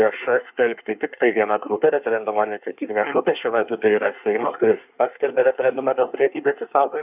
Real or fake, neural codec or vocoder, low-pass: fake; codec, 24 kHz, 1 kbps, SNAC; 3.6 kHz